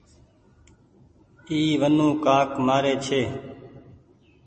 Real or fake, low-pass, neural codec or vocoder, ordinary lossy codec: real; 10.8 kHz; none; MP3, 32 kbps